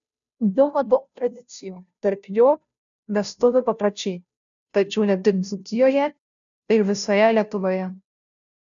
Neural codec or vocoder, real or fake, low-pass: codec, 16 kHz, 0.5 kbps, FunCodec, trained on Chinese and English, 25 frames a second; fake; 7.2 kHz